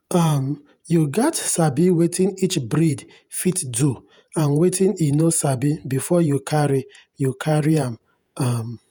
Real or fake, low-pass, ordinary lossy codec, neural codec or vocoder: fake; none; none; vocoder, 48 kHz, 128 mel bands, Vocos